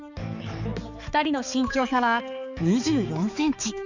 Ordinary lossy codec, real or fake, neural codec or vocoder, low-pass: none; fake; codec, 16 kHz, 4 kbps, X-Codec, HuBERT features, trained on balanced general audio; 7.2 kHz